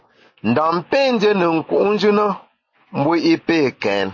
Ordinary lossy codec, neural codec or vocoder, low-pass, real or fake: MP3, 32 kbps; none; 7.2 kHz; real